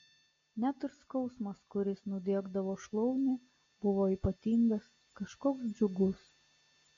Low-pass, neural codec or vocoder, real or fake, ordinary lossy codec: 7.2 kHz; none; real; AAC, 32 kbps